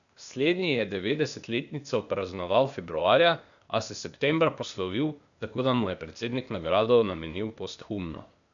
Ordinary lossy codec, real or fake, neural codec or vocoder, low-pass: none; fake; codec, 16 kHz, 0.8 kbps, ZipCodec; 7.2 kHz